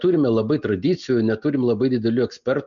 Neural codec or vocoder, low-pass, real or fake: none; 7.2 kHz; real